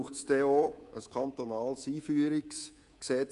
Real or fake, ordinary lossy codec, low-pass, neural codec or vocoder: fake; AAC, 48 kbps; 10.8 kHz; codec, 24 kHz, 3.1 kbps, DualCodec